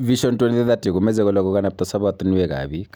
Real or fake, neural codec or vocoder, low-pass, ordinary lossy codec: real; none; none; none